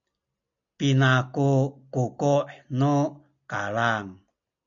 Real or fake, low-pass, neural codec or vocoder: real; 7.2 kHz; none